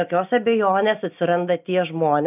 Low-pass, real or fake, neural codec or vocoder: 3.6 kHz; real; none